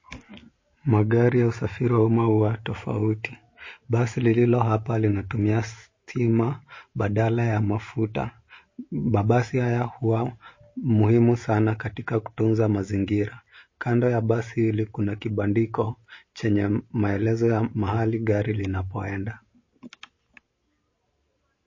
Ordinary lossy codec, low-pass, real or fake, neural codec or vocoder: MP3, 32 kbps; 7.2 kHz; real; none